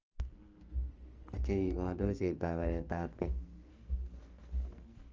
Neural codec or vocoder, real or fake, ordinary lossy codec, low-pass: codec, 44.1 kHz, 2.6 kbps, SNAC; fake; Opus, 24 kbps; 7.2 kHz